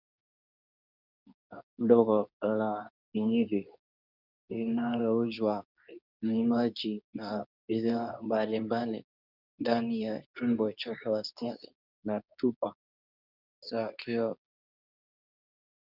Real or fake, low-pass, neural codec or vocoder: fake; 5.4 kHz; codec, 24 kHz, 0.9 kbps, WavTokenizer, medium speech release version 2